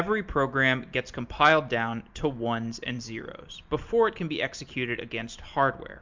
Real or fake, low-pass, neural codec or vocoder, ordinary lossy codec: real; 7.2 kHz; none; MP3, 64 kbps